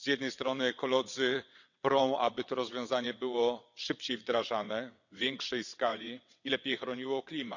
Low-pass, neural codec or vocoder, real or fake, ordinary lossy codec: 7.2 kHz; vocoder, 22.05 kHz, 80 mel bands, WaveNeXt; fake; none